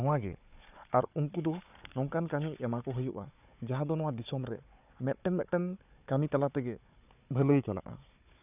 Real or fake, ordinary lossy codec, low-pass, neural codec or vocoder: fake; none; 3.6 kHz; codec, 16 kHz, 4 kbps, FunCodec, trained on Chinese and English, 50 frames a second